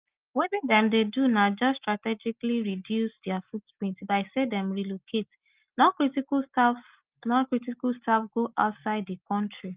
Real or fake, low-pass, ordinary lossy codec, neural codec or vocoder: real; 3.6 kHz; Opus, 32 kbps; none